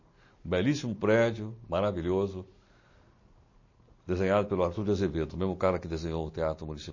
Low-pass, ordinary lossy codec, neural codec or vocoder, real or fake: 7.2 kHz; MP3, 32 kbps; none; real